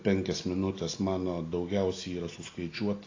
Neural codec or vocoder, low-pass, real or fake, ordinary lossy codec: none; 7.2 kHz; real; AAC, 32 kbps